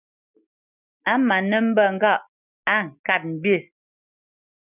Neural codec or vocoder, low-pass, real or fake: none; 3.6 kHz; real